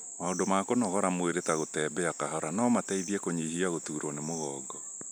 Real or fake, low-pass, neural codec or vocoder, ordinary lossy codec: real; none; none; none